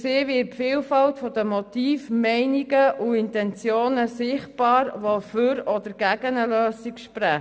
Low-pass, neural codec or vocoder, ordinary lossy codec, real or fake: none; none; none; real